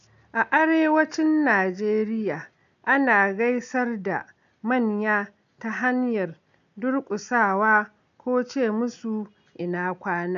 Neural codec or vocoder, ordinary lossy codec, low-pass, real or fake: none; AAC, 96 kbps; 7.2 kHz; real